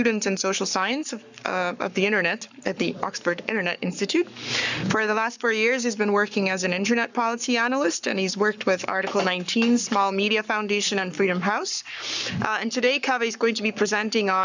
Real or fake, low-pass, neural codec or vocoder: fake; 7.2 kHz; codec, 44.1 kHz, 7.8 kbps, Pupu-Codec